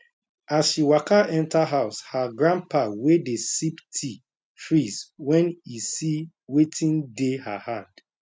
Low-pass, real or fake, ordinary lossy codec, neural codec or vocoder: none; real; none; none